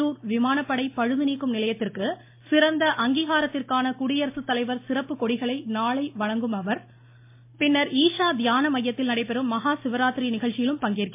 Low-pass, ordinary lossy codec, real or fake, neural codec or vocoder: 3.6 kHz; MP3, 24 kbps; real; none